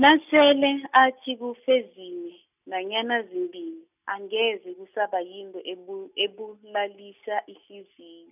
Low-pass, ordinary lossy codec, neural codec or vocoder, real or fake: 3.6 kHz; none; none; real